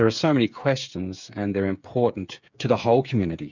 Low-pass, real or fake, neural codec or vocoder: 7.2 kHz; fake; codec, 16 kHz, 8 kbps, FreqCodec, smaller model